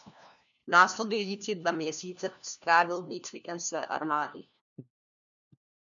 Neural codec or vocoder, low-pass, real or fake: codec, 16 kHz, 1 kbps, FunCodec, trained on LibriTTS, 50 frames a second; 7.2 kHz; fake